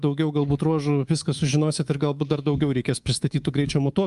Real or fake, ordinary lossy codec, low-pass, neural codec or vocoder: fake; Opus, 32 kbps; 10.8 kHz; codec, 24 kHz, 3.1 kbps, DualCodec